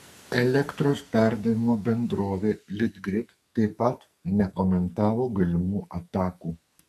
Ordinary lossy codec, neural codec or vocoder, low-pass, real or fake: MP3, 96 kbps; codec, 44.1 kHz, 2.6 kbps, SNAC; 14.4 kHz; fake